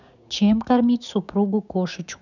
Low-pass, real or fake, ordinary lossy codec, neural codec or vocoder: 7.2 kHz; fake; none; codec, 16 kHz, 6 kbps, DAC